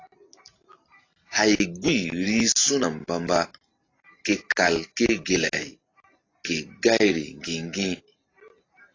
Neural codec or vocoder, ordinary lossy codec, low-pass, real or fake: none; AAC, 32 kbps; 7.2 kHz; real